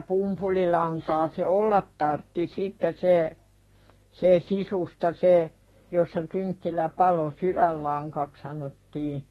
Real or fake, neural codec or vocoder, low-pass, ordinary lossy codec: fake; codec, 32 kHz, 1.9 kbps, SNAC; 14.4 kHz; AAC, 32 kbps